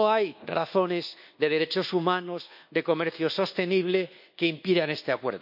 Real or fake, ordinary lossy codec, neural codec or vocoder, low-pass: fake; none; codec, 24 kHz, 1.2 kbps, DualCodec; 5.4 kHz